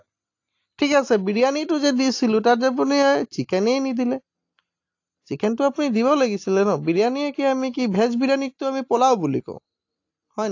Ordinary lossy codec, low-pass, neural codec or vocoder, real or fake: AAC, 48 kbps; 7.2 kHz; none; real